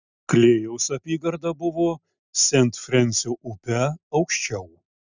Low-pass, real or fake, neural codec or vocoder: 7.2 kHz; real; none